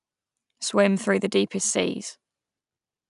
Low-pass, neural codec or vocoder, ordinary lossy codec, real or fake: 10.8 kHz; none; none; real